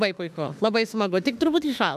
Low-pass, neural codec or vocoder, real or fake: 14.4 kHz; autoencoder, 48 kHz, 32 numbers a frame, DAC-VAE, trained on Japanese speech; fake